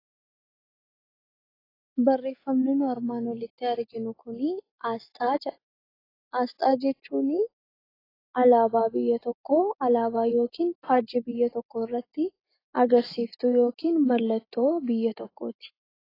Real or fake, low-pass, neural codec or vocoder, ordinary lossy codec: real; 5.4 kHz; none; AAC, 24 kbps